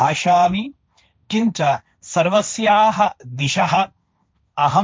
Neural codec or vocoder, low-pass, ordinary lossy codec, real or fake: codec, 16 kHz, 1.1 kbps, Voila-Tokenizer; none; none; fake